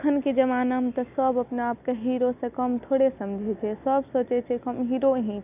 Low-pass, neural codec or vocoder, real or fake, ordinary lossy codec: 3.6 kHz; none; real; none